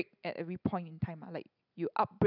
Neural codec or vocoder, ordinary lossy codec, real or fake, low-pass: none; none; real; 5.4 kHz